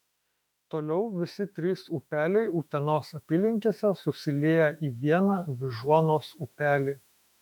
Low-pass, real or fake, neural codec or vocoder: 19.8 kHz; fake; autoencoder, 48 kHz, 32 numbers a frame, DAC-VAE, trained on Japanese speech